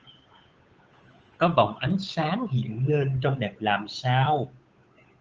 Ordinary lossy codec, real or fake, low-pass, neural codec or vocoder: Opus, 24 kbps; fake; 7.2 kHz; codec, 16 kHz, 8 kbps, FunCodec, trained on Chinese and English, 25 frames a second